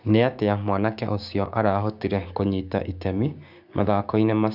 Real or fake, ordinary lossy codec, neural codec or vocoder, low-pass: fake; none; codec, 16 kHz, 6 kbps, DAC; 5.4 kHz